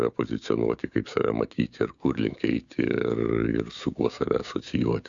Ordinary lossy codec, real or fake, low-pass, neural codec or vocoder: Opus, 64 kbps; fake; 7.2 kHz; codec, 16 kHz, 6 kbps, DAC